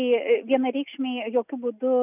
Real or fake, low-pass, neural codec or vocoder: real; 3.6 kHz; none